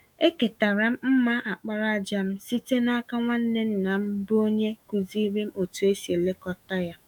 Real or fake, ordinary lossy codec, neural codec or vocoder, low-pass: fake; none; autoencoder, 48 kHz, 128 numbers a frame, DAC-VAE, trained on Japanese speech; 19.8 kHz